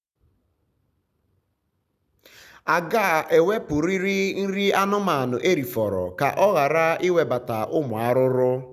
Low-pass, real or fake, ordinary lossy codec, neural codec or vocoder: 14.4 kHz; real; none; none